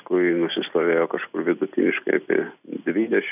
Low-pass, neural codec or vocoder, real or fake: 3.6 kHz; none; real